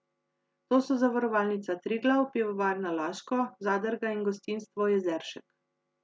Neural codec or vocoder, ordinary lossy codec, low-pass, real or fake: none; none; none; real